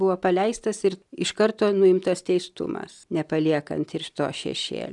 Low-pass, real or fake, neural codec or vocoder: 10.8 kHz; real; none